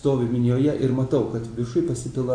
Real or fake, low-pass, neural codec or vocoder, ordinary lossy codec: real; 9.9 kHz; none; AAC, 48 kbps